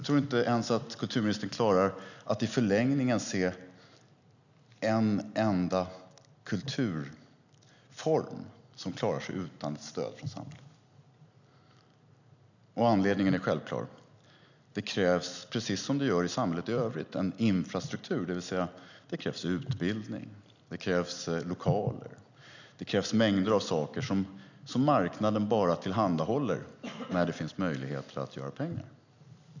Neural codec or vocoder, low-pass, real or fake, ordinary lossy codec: none; 7.2 kHz; real; none